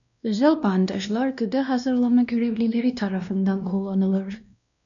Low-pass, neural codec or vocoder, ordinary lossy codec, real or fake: 7.2 kHz; codec, 16 kHz, 1 kbps, X-Codec, WavLM features, trained on Multilingual LibriSpeech; MP3, 96 kbps; fake